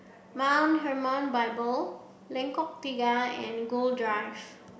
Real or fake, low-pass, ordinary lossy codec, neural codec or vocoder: real; none; none; none